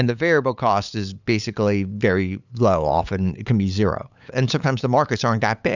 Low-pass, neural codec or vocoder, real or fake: 7.2 kHz; codec, 16 kHz, 8 kbps, FunCodec, trained on LibriTTS, 25 frames a second; fake